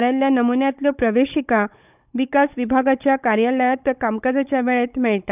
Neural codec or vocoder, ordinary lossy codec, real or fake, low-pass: codec, 16 kHz, 16 kbps, FunCodec, trained on Chinese and English, 50 frames a second; none; fake; 3.6 kHz